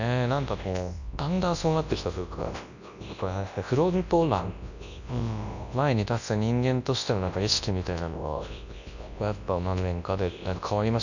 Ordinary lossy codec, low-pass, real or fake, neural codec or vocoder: none; 7.2 kHz; fake; codec, 24 kHz, 0.9 kbps, WavTokenizer, large speech release